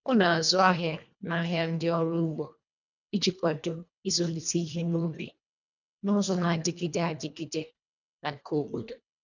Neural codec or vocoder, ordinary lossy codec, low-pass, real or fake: codec, 24 kHz, 1.5 kbps, HILCodec; none; 7.2 kHz; fake